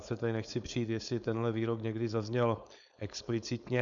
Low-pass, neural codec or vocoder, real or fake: 7.2 kHz; codec, 16 kHz, 4.8 kbps, FACodec; fake